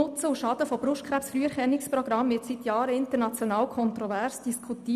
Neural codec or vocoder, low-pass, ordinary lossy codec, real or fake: none; 14.4 kHz; none; real